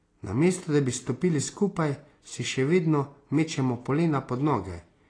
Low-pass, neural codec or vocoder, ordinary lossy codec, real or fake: 9.9 kHz; none; AAC, 32 kbps; real